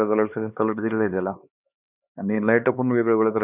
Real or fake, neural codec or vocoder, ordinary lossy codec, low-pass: fake; codec, 16 kHz, 2 kbps, X-Codec, HuBERT features, trained on LibriSpeech; none; 3.6 kHz